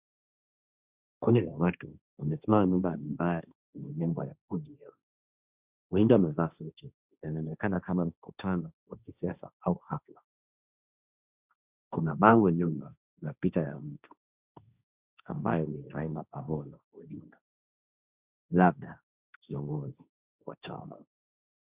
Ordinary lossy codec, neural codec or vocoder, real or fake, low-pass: Opus, 64 kbps; codec, 16 kHz, 1.1 kbps, Voila-Tokenizer; fake; 3.6 kHz